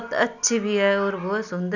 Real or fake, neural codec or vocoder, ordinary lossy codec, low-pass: real; none; none; 7.2 kHz